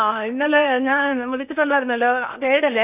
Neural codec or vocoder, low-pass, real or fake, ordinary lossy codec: codec, 16 kHz in and 24 kHz out, 0.8 kbps, FocalCodec, streaming, 65536 codes; 3.6 kHz; fake; AAC, 32 kbps